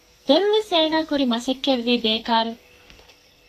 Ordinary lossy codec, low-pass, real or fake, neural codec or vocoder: AAC, 64 kbps; 14.4 kHz; fake; codec, 44.1 kHz, 2.6 kbps, SNAC